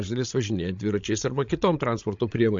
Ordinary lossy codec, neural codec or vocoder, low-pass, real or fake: MP3, 48 kbps; codec, 16 kHz, 16 kbps, FreqCodec, larger model; 7.2 kHz; fake